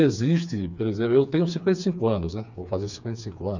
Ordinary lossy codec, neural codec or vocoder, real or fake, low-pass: none; codec, 16 kHz, 4 kbps, FreqCodec, smaller model; fake; 7.2 kHz